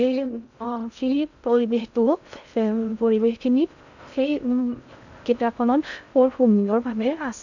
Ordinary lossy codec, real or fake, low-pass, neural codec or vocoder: none; fake; 7.2 kHz; codec, 16 kHz in and 24 kHz out, 0.6 kbps, FocalCodec, streaming, 2048 codes